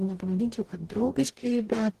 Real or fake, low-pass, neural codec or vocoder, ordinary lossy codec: fake; 14.4 kHz; codec, 44.1 kHz, 0.9 kbps, DAC; Opus, 16 kbps